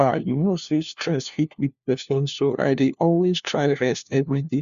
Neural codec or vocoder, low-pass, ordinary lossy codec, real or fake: codec, 16 kHz, 1 kbps, FunCodec, trained on LibriTTS, 50 frames a second; 7.2 kHz; Opus, 64 kbps; fake